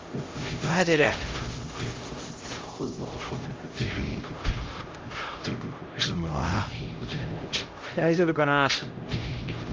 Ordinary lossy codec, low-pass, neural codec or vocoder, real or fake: Opus, 32 kbps; 7.2 kHz; codec, 16 kHz, 0.5 kbps, X-Codec, HuBERT features, trained on LibriSpeech; fake